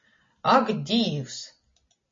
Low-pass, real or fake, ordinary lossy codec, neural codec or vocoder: 7.2 kHz; real; MP3, 32 kbps; none